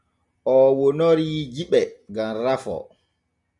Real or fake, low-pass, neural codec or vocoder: real; 10.8 kHz; none